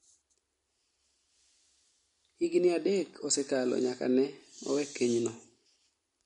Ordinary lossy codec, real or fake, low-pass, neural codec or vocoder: MP3, 48 kbps; real; 10.8 kHz; none